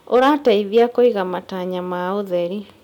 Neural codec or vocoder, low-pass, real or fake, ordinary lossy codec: none; 19.8 kHz; real; none